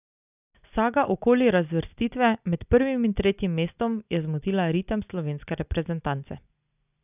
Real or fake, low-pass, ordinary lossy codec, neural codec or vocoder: real; 3.6 kHz; none; none